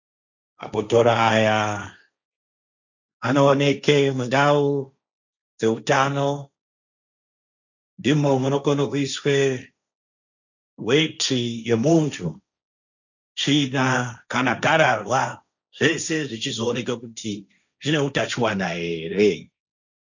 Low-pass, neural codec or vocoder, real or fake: 7.2 kHz; codec, 16 kHz, 1.1 kbps, Voila-Tokenizer; fake